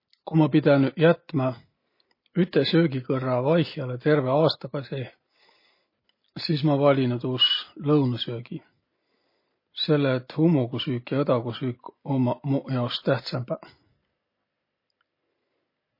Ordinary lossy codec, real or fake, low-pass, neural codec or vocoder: MP3, 24 kbps; real; 5.4 kHz; none